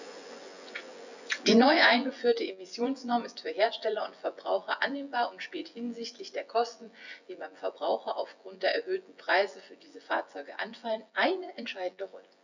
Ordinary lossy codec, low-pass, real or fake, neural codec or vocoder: none; 7.2 kHz; fake; vocoder, 24 kHz, 100 mel bands, Vocos